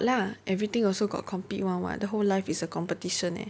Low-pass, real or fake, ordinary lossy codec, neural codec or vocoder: none; real; none; none